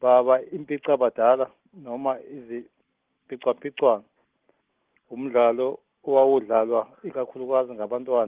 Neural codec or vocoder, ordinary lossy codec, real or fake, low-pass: none; Opus, 16 kbps; real; 3.6 kHz